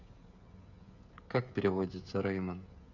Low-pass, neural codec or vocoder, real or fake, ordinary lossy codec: 7.2 kHz; codec, 16 kHz, 16 kbps, FreqCodec, smaller model; fake; none